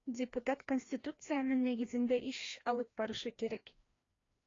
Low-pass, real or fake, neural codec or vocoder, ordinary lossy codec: 7.2 kHz; fake; codec, 16 kHz, 1 kbps, FreqCodec, larger model; AAC, 32 kbps